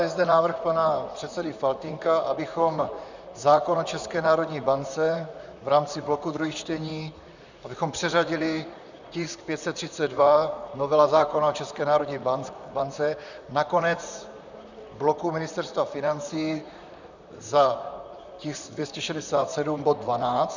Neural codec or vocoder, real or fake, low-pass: vocoder, 44.1 kHz, 128 mel bands, Pupu-Vocoder; fake; 7.2 kHz